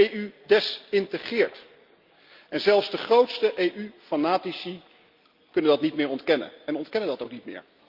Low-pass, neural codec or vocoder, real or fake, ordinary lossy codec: 5.4 kHz; none; real; Opus, 24 kbps